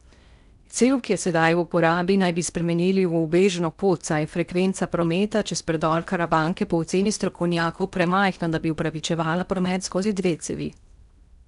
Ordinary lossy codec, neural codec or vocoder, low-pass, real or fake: none; codec, 16 kHz in and 24 kHz out, 0.8 kbps, FocalCodec, streaming, 65536 codes; 10.8 kHz; fake